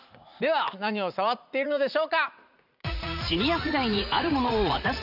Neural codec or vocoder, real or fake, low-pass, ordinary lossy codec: vocoder, 44.1 kHz, 80 mel bands, Vocos; fake; 5.4 kHz; none